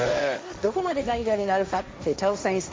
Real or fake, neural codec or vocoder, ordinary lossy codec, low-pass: fake; codec, 16 kHz, 1.1 kbps, Voila-Tokenizer; none; none